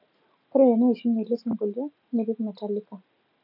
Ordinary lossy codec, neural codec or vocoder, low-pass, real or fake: none; none; 5.4 kHz; real